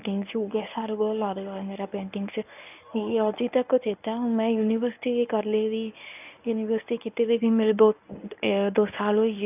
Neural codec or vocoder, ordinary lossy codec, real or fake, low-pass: codec, 24 kHz, 0.9 kbps, WavTokenizer, medium speech release version 1; none; fake; 3.6 kHz